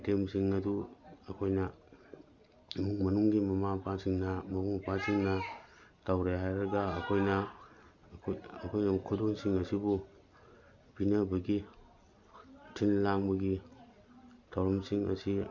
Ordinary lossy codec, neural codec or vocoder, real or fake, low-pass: none; none; real; 7.2 kHz